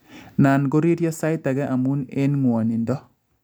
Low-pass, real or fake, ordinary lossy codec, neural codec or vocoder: none; real; none; none